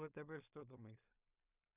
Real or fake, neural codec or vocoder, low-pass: fake; codec, 16 kHz in and 24 kHz out, 0.4 kbps, LongCat-Audio-Codec, two codebook decoder; 3.6 kHz